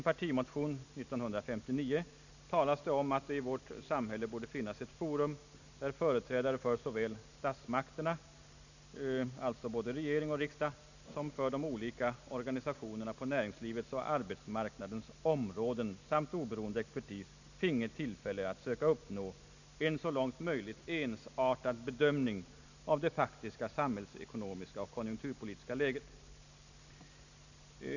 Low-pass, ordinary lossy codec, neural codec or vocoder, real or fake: 7.2 kHz; none; none; real